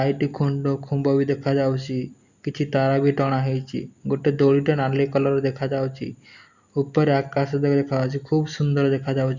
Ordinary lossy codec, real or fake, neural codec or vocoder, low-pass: Opus, 64 kbps; real; none; 7.2 kHz